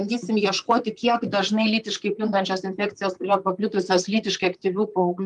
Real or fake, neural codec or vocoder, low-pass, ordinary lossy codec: fake; vocoder, 44.1 kHz, 128 mel bands, Pupu-Vocoder; 10.8 kHz; Opus, 32 kbps